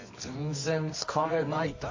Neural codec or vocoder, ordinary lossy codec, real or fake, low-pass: codec, 24 kHz, 0.9 kbps, WavTokenizer, medium music audio release; MP3, 32 kbps; fake; 7.2 kHz